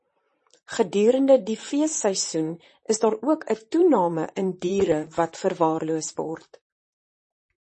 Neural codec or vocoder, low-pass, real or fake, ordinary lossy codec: vocoder, 44.1 kHz, 128 mel bands, Pupu-Vocoder; 10.8 kHz; fake; MP3, 32 kbps